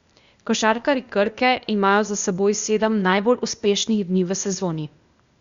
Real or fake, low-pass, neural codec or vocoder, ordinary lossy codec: fake; 7.2 kHz; codec, 16 kHz, 0.8 kbps, ZipCodec; Opus, 64 kbps